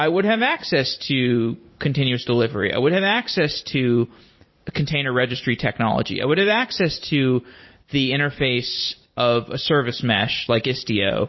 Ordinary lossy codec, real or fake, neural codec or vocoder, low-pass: MP3, 24 kbps; fake; vocoder, 44.1 kHz, 128 mel bands every 256 samples, BigVGAN v2; 7.2 kHz